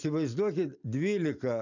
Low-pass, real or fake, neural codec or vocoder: 7.2 kHz; real; none